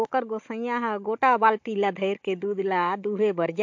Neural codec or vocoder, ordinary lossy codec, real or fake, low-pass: codec, 16 kHz, 16 kbps, FunCodec, trained on Chinese and English, 50 frames a second; MP3, 48 kbps; fake; 7.2 kHz